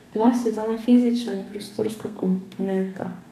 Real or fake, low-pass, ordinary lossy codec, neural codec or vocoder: fake; 14.4 kHz; none; codec, 32 kHz, 1.9 kbps, SNAC